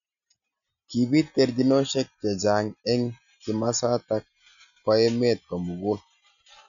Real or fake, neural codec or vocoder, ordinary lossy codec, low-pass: real; none; none; 7.2 kHz